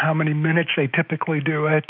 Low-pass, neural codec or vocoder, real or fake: 5.4 kHz; autoencoder, 48 kHz, 128 numbers a frame, DAC-VAE, trained on Japanese speech; fake